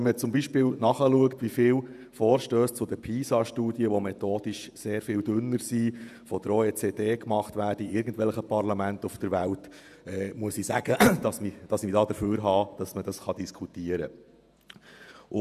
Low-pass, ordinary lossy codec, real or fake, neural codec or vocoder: 14.4 kHz; none; fake; vocoder, 48 kHz, 128 mel bands, Vocos